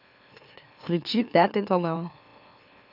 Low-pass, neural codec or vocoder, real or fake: 5.4 kHz; autoencoder, 44.1 kHz, a latent of 192 numbers a frame, MeloTTS; fake